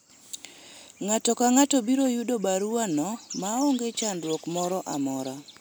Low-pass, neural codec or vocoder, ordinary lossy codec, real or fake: none; none; none; real